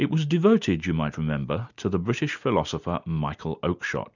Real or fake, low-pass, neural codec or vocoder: real; 7.2 kHz; none